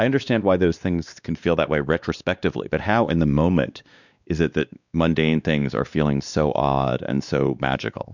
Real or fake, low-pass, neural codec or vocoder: fake; 7.2 kHz; codec, 16 kHz, 2 kbps, X-Codec, WavLM features, trained on Multilingual LibriSpeech